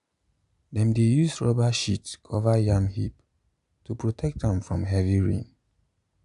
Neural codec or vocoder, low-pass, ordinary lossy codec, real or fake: none; 10.8 kHz; AAC, 96 kbps; real